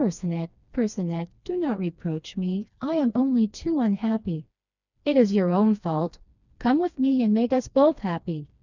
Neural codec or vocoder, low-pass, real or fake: codec, 16 kHz, 2 kbps, FreqCodec, smaller model; 7.2 kHz; fake